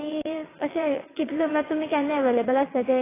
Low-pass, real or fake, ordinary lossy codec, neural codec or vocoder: 3.6 kHz; fake; AAC, 16 kbps; vocoder, 22.05 kHz, 80 mel bands, WaveNeXt